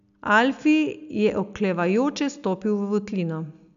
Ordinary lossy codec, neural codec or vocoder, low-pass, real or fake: none; none; 7.2 kHz; real